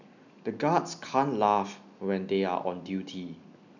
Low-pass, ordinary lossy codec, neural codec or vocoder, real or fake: 7.2 kHz; none; none; real